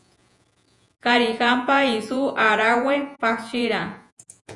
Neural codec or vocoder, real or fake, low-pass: vocoder, 48 kHz, 128 mel bands, Vocos; fake; 10.8 kHz